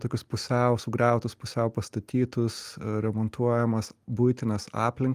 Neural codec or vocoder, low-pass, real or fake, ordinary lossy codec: none; 14.4 kHz; real; Opus, 32 kbps